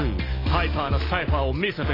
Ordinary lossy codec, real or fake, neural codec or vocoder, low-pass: AAC, 24 kbps; real; none; 5.4 kHz